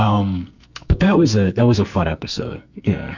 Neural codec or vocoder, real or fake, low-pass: codec, 44.1 kHz, 2.6 kbps, SNAC; fake; 7.2 kHz